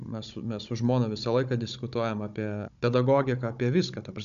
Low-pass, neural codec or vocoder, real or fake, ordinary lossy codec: 7.2 kHz; codec, 16 kHz, 16 kbps, FunCodec, trained on Chinese and English, 50 frames a second; fake; MP3, 96 kbps